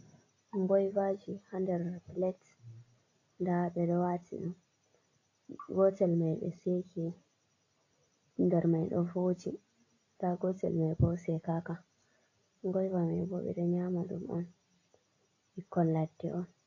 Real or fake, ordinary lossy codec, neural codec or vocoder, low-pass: real; AAC, 32 kbps; none; 7.2 kHz